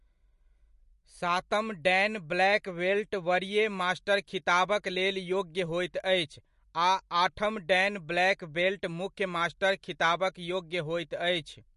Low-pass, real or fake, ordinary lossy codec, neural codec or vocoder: 14.4 kHz; real; MP3, 48 kbps; none